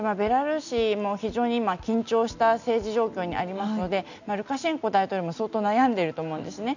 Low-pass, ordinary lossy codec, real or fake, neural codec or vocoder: 7.2 kHz; none; real; none